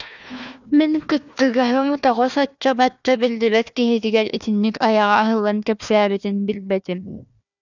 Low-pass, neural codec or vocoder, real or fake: 7.2 kHz; codec, 16 kHz, 1 kbps, FunCodec, trained on Chinese and English, 50 frames a second; fake